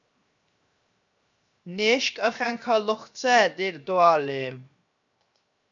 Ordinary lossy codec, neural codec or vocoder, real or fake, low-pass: MP3, 64 kbps; codec, 16 kHz, 0.7 kbps, FocalCodec; fake; 7.2 kHz